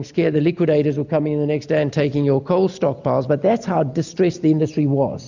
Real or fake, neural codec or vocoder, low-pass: real; none; 7.2 kHz